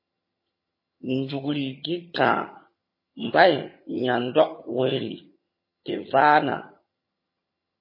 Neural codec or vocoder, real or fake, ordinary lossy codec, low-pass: vocoder, 22.05 kHz, 80 mel bands, HiFi-GAN; fake; MP3, 24 kbps; 5.4 kHz